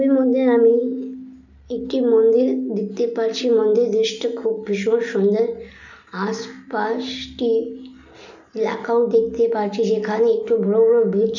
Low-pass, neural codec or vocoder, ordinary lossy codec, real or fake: 7.2 kHz; none; none; real